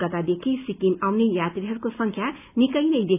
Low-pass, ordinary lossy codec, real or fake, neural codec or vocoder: 3.6 kHz; none; real; none